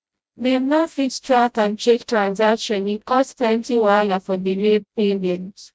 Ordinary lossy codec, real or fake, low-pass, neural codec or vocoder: none; fake; none; codec, 16 kHz, 0.5 kbps, FreqCodec, smaller model